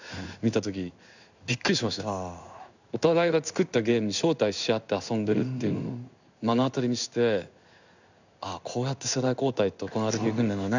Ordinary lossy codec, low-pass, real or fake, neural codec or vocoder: none; 7.2 kHz; fake; codec, 16 kHz in and 24 kHz out, 1 kbps, XY-Tokenizer